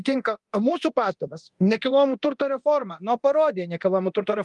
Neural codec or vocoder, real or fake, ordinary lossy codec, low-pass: codec, 24 kHz, 0.9 kbps, DualCodec; fake; Opus, 32 kbps; 10.8 kHz